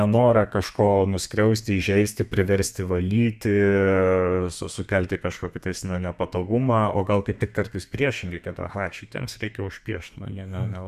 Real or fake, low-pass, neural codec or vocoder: fake; 14.4 kHz; codec, 32 kHz, 1.9 kbps, SNAC